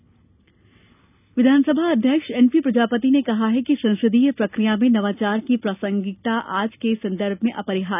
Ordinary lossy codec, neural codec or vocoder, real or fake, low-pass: none; none; real; 3.6 kHz